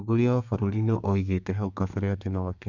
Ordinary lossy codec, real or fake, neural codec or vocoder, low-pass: none; fake; codec, 32 kHz, 1.9 kbps, SNAC; 7.2 kHz